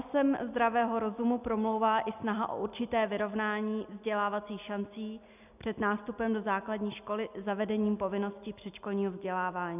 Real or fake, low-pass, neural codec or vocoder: real; 3.6 kHz; none